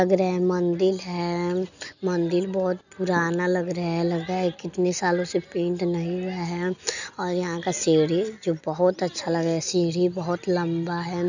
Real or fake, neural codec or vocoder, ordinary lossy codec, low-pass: real; none; none; 7.2 kHz